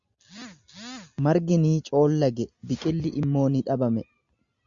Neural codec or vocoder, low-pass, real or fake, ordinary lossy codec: none; 7.2 kHz; real; Opus, 64 kbps